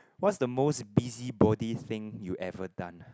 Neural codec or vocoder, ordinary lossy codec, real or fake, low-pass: none; none; real; none